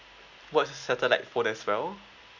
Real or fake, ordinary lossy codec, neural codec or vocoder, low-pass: real; none; none; 7.2 kHz